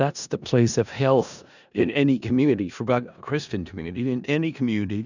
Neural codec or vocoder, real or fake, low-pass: codec, 16 kHz in and 24 kHz out, 0.4 kbps, LongCat-Audio-Codec, four codebook decoder; fake; 7.2 kHz